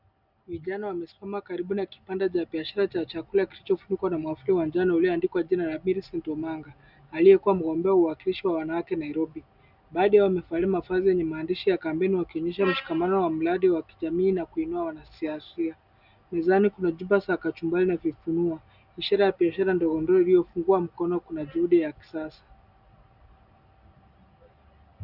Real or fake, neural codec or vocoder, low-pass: real; none; 5.4 kHz